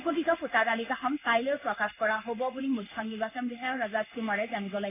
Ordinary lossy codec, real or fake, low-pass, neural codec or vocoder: none; fake; 3.6 kHz; codec, 16 kHz in and 24 kHz out, 1 kbps, XY-Tokenizer